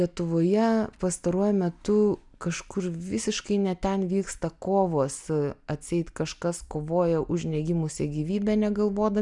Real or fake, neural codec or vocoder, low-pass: real; none; 10.8 kHz